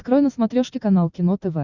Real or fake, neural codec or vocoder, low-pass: real; none; 7.2 kHz